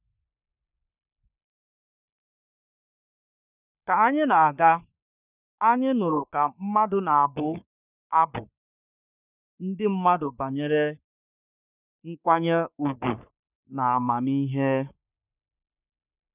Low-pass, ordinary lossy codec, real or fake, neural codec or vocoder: 3.6 kHz; none; fake; codec, 44.1 kHz, 3.4 kbps, Pupu-Codec